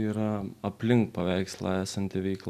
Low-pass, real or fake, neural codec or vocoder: 14.4 kHz; real; none